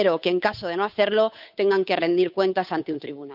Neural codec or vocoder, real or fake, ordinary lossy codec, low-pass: codec, 16 kHz, 8 kbps, FunCodec, trained on Chinese and English, 25 frames a second; fake; none; 5.4 kHz